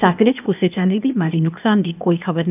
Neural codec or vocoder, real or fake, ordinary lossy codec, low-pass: codec, 16 kHz, 0.8 kbps, ZipCodec; fake; none; 3.6 kHz